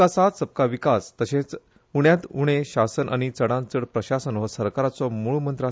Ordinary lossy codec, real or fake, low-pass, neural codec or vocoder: none; real; none; none